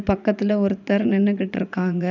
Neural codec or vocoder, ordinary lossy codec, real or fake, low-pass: vocoder, 44.1 kHz, 80 mel bands, Vocos; none; fake; 7.2 kHz